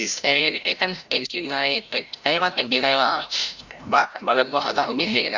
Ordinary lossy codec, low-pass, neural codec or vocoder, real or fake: Opus, 64 kbps; 7.2 kHz; codec, 16 kHz, 0.5 kbps, FreqCodec, larger model; fake